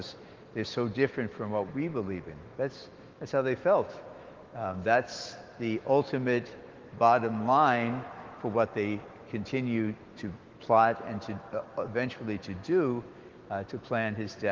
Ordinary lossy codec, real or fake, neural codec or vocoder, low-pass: Opus, 16 kbps; fake; autoencoder, 48 kHz, 128 numbers a frame, DAC-VAE, trained on Japanese speech; 7.2 kHz